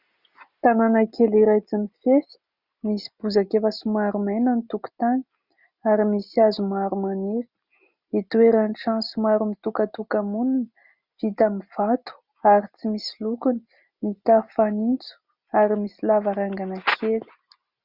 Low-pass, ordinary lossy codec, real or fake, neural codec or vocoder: 5.4 kHz; Opus, 64 kbps; real; none